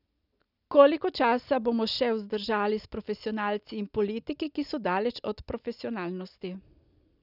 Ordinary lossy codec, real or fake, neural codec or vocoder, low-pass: none; real; none; 5.4 kHz